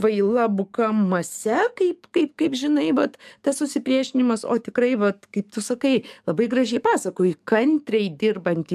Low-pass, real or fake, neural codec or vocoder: 14.4 kHz; fake; codec, 44.1 kHz, 7.8 kbps, DAC